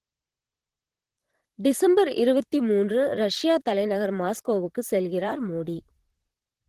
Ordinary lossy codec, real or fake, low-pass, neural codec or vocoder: Opus, 16 kbps; fake; 14.4 kHz; vocoder, 44.1 kHz, 128 mel bands, Pupu-Vocoder